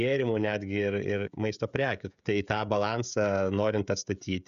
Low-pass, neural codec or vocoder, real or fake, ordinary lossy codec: 7.2 kHz; codec, 16 kHz, 16 kbps, FreqCodec, smaller model; fake; MP3, 96 kbps